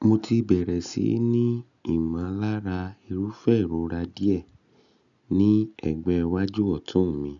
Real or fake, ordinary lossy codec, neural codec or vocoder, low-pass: real; none; none; 7.2 kHz